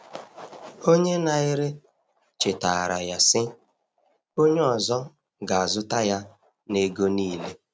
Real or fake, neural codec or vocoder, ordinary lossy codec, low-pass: real; none; none; none